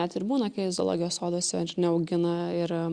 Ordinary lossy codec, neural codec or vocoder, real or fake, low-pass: Opus, 64 kbps; none; real; 9.9 kHz